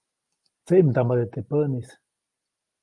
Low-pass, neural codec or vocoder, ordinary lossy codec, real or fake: 10.8 kHz; none; Opus, 32 kbps; real